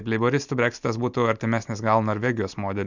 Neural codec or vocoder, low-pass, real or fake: none; 7.2 kHz; real